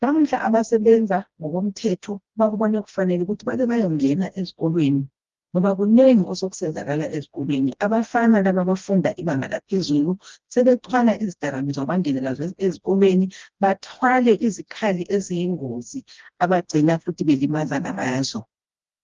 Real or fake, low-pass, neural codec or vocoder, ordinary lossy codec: fake; 7.2 kHz; codec, 16 kHz, 1 kbps, FreqCodec, smaller model; Opus, 32 kbps